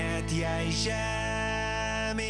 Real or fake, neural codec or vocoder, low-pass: real; none; 9.9 kHz